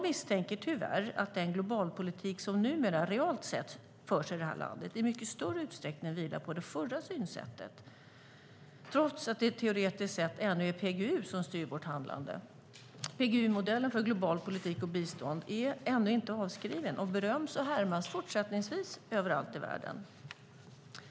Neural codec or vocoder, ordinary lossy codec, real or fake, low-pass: none; none; real; none